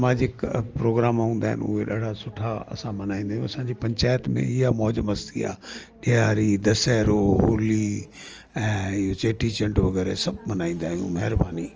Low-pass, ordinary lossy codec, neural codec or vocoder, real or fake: 7.2 kHz; Opus, 24 kbps; none; real